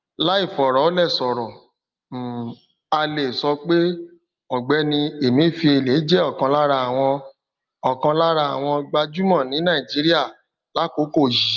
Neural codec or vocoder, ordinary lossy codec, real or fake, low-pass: none; Opus, 24 kbps; real; 7.2 kHz